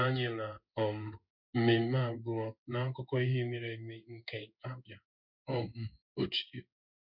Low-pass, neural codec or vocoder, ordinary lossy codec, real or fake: 5.4 kHz; codec, 16 kHz in and 24 kHz out, 1 kbps, XY-Tokenizer; none; fake